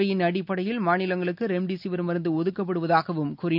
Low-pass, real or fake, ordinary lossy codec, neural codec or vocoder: 5.4 kHz; real; none; none